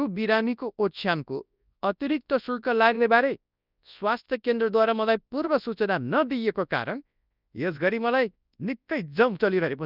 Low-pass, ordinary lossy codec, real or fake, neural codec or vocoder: 5.4 kHz; none; fake; codec, 24 kHz, 0.9 kbps, WavTokenizer, large speech release